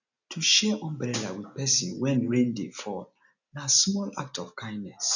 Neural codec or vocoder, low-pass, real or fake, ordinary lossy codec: none; 7.2 kHz; real; none